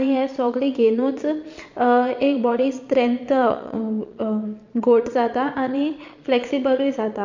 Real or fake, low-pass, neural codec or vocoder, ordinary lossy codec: fake; 7.2 kHz; vocoder, 22.05 kHz, 80 mel bands, Vocos; MP3, 48 kbps